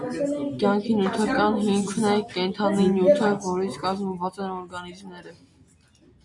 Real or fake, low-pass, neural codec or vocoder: real; 10.8 kHz; none